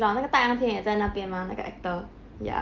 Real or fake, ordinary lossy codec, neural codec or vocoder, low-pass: real; Opus, 32 kbps; none; 7.2 kHz